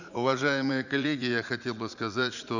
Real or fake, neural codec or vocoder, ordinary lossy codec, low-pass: fake; vocoder, 44.1 kHz, 80 mel bands, Vocos; none; 7.2 kHz